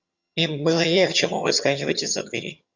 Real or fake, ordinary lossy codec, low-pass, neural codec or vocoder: fake; Opus, 64 kbps; 7.2 kHz; vocoder, 22.05 kHz, 80 mel bands, HiFi-GAN